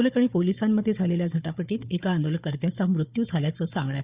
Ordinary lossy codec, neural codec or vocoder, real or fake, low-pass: Opus, 64 kbps; codec, 16 kHz, 4 kbps, FunCodec, trained on Chinese and English, 50 frames a second; fake; 3.6 kHz